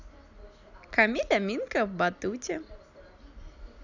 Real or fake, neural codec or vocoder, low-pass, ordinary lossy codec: real; none; 7.2 kHz; none